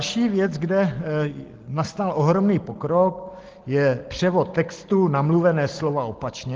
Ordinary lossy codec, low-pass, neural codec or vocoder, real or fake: Opus, 16 kbps; 7.2 kHz; none; real